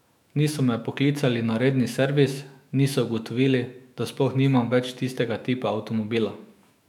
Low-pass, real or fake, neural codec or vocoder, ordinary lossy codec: 19.8 kHz; fake; autoencoder, 48 kHz, 128 numbers a frame, DAC-VAE, trained on Japanese speech; none